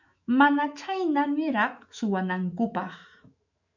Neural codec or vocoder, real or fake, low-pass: autoencoder, 48 kHz, 128 numbers a frame, DAC-VAE, trained on Japanese speech; fake; 7.2 kHz